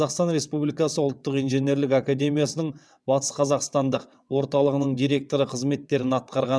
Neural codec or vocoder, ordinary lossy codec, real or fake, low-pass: vocoder, 22.05 kHz, 80 mel bands, WaveNeXt; none; fake; none